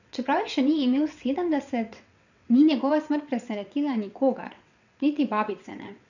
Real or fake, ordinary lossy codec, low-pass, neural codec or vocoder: fake; none; 7.2 kHz; vocoder, 22.05 kHz, 80 mel bands, WaveNeXt